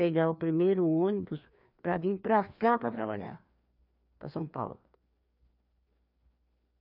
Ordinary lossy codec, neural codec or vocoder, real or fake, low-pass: none; codec, 16 kHz, 2 kbps, FreqCodec, larger model; fake; 5.4 kHz